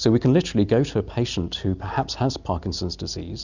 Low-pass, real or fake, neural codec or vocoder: 7.2 kHz; real; none